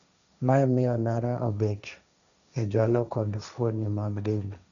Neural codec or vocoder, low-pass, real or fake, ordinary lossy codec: codec, 16 kHz, 1.1 kbps, Voila-Tokenizer; 7.2 kHz; fake; none